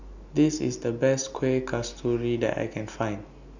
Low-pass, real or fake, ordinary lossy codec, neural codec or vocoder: 7.2 kHz; real; none; none